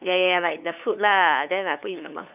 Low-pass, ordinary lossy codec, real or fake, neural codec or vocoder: 3.6 kHz; none; fake; codec, 16 kHz, 2 kbps, FunCodec, trained on LibriTTS, 25 frames a second